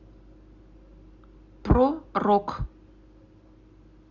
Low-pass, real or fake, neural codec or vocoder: 7.2 kHz; real; none